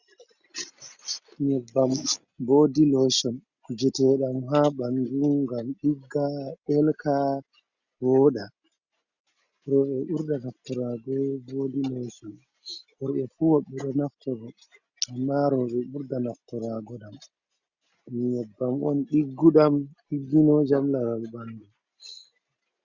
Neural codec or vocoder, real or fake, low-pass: none; real; 7.2 kHz